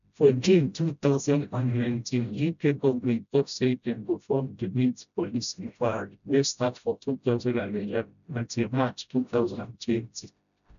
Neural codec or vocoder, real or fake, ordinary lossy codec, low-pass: codec, 16 kHz, 0.5 kbps, FreqCodec, smaller model; fake; none; 7.2 kHz